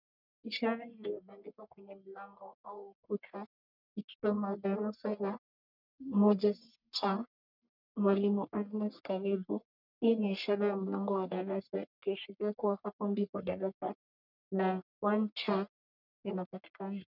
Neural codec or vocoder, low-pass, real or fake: codec, 44.1 kHz, 1.7 kbps, Pupu-Codec; 5.4 kHz; fake